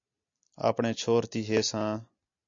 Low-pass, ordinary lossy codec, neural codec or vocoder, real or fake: 7.2 kHz; AAC, 64 kbps; none; real